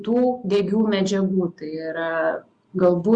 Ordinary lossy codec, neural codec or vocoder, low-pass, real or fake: Opus, 64 kbps; none; 9.9 kHz; real